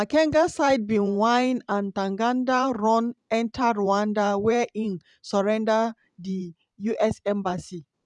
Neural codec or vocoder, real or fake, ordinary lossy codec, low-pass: vocoder, 44.1 kHz, 128 mel bands every 512 samples, BigVGAN v2; fake; none; 10.8 kHz